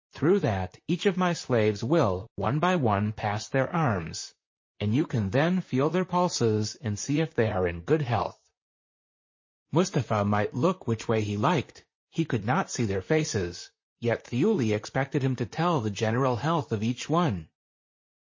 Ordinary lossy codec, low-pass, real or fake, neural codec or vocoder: MP3, 32 kbps; 7.2 kHz; fake; vocoder, 44.1 kHz, 128 mel bands, Pupu-Vocoder